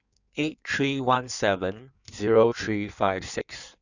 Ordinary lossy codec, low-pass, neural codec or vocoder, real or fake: none; 7.2 kHz; codec, 16 kHz in and 24 kHz out, 1.1 kbps, FireRedTTS-2 codec; fake